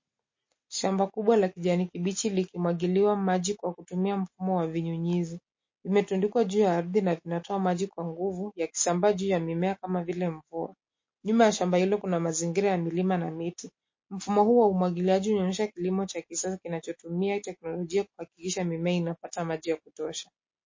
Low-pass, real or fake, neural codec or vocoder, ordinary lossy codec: 7.2 kHz; real; none; MP3, 32 kbps